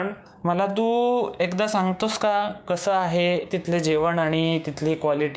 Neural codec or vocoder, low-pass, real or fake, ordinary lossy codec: codec, 16 kHz, 6 kbps, DAC; none; fake; none